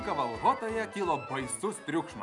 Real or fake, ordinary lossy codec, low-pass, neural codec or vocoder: real; MP3, 96 kbps; 10.8 kHz; none